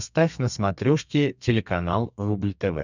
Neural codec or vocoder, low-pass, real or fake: codec, 32 kHz, 1.9 kbps, SNAC; 7.2 kHz; fake